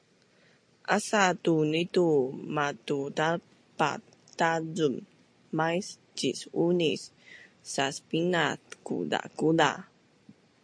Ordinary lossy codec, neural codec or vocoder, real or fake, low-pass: MP3, 48 kbps; none; real; 9.9 kHz